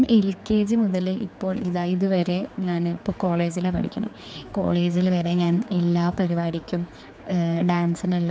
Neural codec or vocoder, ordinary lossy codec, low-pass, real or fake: codec, 16 kHz, 4 kbps, X-Codec, HuBERT features, trained on general audio; none; none; fake